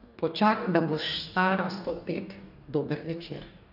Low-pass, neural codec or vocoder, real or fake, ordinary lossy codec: 5.4 kHz; codec, 44.1 kHz, 2.6 kbps, DAC; fake; none